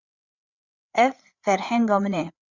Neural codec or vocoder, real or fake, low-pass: codec, 16 kHz, 8 kbps, FreqCodec, larger model; fake; 7.2 kHz